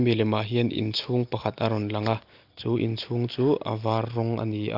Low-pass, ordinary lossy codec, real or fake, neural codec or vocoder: 5.4 kHz; Opus, 24 kbps; real; none